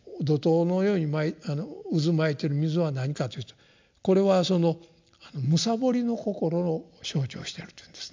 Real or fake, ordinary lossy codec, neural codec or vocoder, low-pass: real; MP3, 64 kbps; none; 7.2 kHz